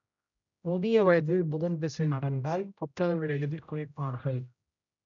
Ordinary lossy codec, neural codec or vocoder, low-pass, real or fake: none; codec, 16 kHz, 0.5 kbps, X-Codec, HuBERT features, trained on general audio; 7.2 kHz; fake